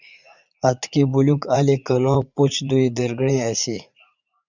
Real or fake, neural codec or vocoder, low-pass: fake; vocoder, 44.1 kHz, 80 mel bands, Vocos; 7.2 kHz